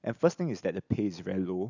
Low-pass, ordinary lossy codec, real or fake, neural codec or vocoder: 7.2 kHz; none; real; none